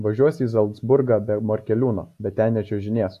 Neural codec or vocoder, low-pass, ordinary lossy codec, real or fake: none; 14.4 kHz; AAC, 64 kbps; real